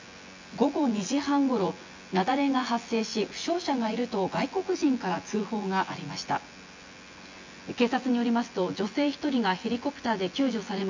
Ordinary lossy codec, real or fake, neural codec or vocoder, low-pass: MP3, 48 kbps; fake; vocoder, 24 kHz, 100 mel bands, Vocos; 7.2 kHz